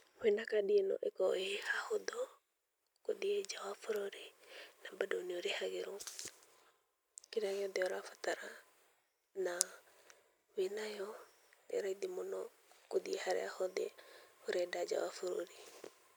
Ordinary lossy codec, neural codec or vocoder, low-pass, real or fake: none; none; none; real